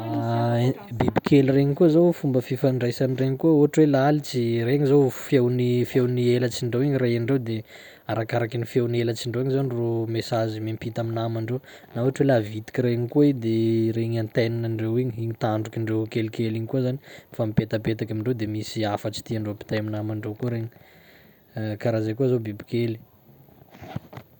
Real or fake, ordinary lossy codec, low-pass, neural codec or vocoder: real; none; 19.8 kHz; none